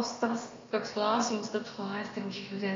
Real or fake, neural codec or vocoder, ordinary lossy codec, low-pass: fake; codec, 16 kHz, about 1 kbps, DyCAST, with the encoder's durations; AAC, 32 kbps; 7.2 kHz